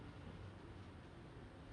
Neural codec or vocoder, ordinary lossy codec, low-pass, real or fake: none; none; 9.9 kHz; real